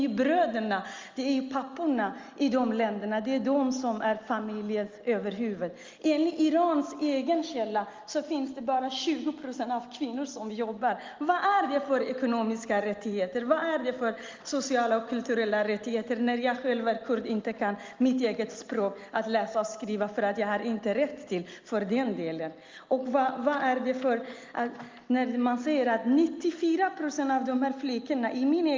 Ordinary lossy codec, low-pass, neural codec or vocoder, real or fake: Opus, 32 kbps; 7.2 kHz; none; real